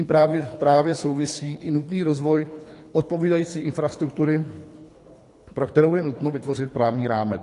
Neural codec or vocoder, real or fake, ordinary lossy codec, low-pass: codec, 24 kHz, 3 kbps, HILCodec; fake; AAC, 48 kbps; 10.8 kHz